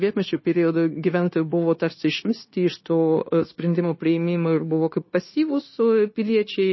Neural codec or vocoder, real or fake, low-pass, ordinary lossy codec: codec, 16 kHz, 0.9 kbps, LongCat-Audio-Codec; fake; 7.2 kHz; MP3, 24 kbps